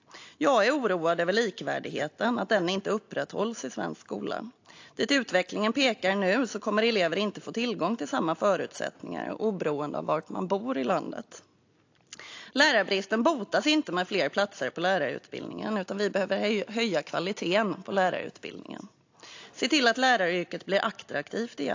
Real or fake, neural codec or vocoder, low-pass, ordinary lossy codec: real; none; 7.2 kHz; AAC, 48 kbps